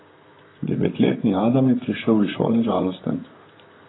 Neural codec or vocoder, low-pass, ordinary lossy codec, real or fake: vocoder, 44.1 kHz, 128 mel bands, Pupu-Vocoder; 7.2 kHz; AAC, 16 kbps; fake